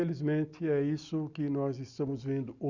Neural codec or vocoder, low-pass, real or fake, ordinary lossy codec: none; 7.2 kHz; real; Opus, 64 kbps